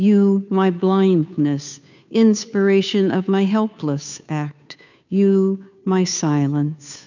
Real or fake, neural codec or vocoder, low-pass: fake; codec, 16 kHz, 2 kbps, FunCodec, trained on Chinese and English, 25 frames a second; 7.2 kHz